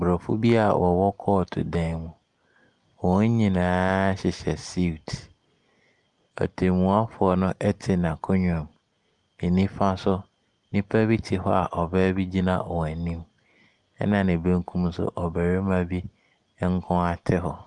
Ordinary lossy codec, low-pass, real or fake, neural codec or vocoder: Opus, 32 kbps; 10.8 kHz; real; none